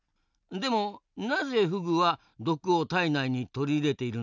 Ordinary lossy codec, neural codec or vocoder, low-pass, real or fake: none; none; 7.2 kHz; real